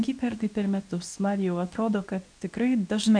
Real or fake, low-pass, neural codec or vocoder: fake; 9.9 kHz; codec, 24 kHz, 0.9 kbps, WavTokenizer, medium speech release version 2